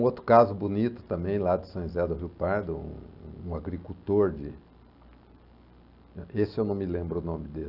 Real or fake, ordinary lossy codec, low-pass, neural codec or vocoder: real; none; 5.4 kHz; none